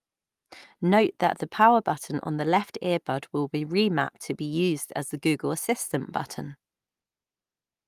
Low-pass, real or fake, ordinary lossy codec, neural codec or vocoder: 14.4 kHz; real; Opus, 32 kbps; none